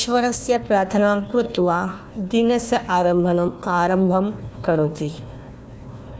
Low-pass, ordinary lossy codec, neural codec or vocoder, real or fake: none; none; codec, 16 kHz, 1 kbps, FunCodec, trained on Chinese and English, 50 frames a second; fake